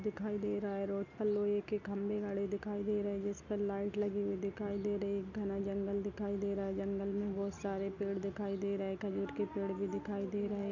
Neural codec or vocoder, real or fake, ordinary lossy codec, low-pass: none; real; none; 7.2 kHz